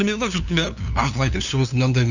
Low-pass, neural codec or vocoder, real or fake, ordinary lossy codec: 7.2 kHz; codec, 16 kHz, 2 kbps, FunCodec, trained on LibriTTS, 25 frames a second; fake; none